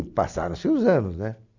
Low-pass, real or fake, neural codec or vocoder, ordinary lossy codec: 7.2 kHz; real; none; none